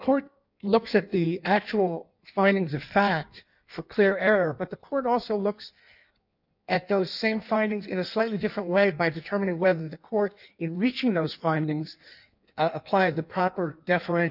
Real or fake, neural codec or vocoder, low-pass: fake; codec, 16 kHz in and 24 kHz out, 1.1 kbps, FireRedTTS-2 codec; 5.4 kHz